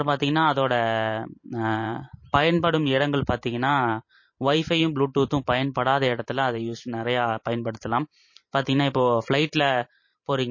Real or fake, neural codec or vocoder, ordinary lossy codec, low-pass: fake; vocoder, 44.1 kHz, 128 mel bands every 512 samples, BigVGAN v2; MP3, 32 kbps; 7.2 kHz